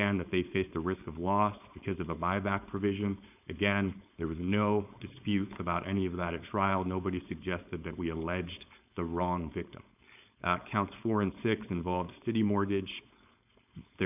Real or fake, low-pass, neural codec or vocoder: fake; 3.6 kHz; codec, 16 kHz, 4.8 kbps, FACodec